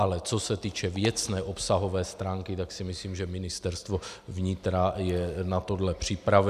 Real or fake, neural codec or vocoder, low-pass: real; none; 14.4 kHz